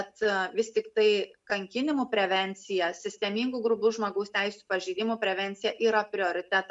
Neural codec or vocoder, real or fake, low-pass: none; real; 10.8 kHz